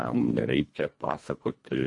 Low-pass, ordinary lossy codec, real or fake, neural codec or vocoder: 10.8 kHz; MP3, 48 kbps; fake; codec, 24 kHz, 1.5 kbps, HILCodec